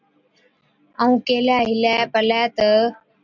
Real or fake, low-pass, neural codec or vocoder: real; 7.2 kHz; none